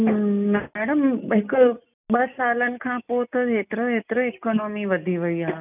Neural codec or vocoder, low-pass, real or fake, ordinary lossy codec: none; 3.6 kHz; real; none